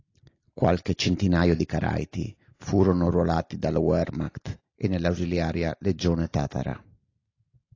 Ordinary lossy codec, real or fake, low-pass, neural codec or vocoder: AAC, 32 kbps; real; 7.2 kHz; none